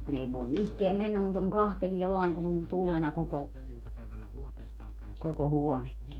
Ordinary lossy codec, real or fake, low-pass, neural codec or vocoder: none; fake; 19.8 kHz; codec, 44.1 kHz, 2.6 kbps, DAC